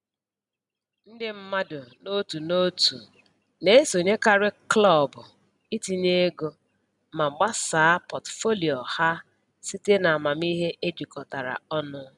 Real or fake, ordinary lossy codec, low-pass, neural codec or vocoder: real; none; 10.8 kHz; none